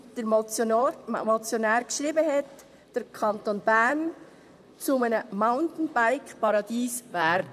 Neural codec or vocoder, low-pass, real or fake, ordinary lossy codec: vocoder, 44.1 kHz, 128 mel bands, Pupu-Vocoder; 14.4 kHz; fake; none